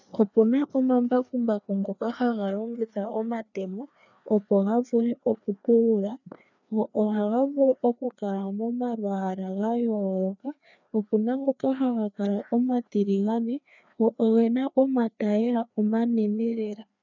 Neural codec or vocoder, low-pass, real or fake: codec, 16 kHz, 2 kbps, FreqCodec, larger model; 7.2 kHz; fake